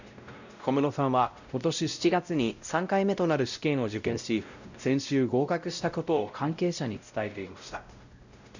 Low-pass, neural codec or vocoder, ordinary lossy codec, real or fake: 7.2 kHz; codec, 16 kHz, 0.5 kbps, X-Codec, WavLM features, trained on Multilingual LibriSpeech; none; fake